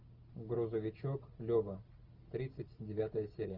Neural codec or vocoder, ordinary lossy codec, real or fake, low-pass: none; Opus, 24 kbps; real; 5.4 kHz